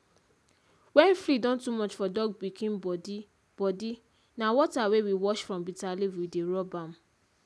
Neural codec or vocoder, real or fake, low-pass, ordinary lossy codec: none; real; none; none